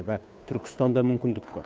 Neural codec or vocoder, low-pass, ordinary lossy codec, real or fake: codec, 16 kHz, 2 kbps, FunCodec, trained on Chinese and English, 25 frames a second; none; none; fake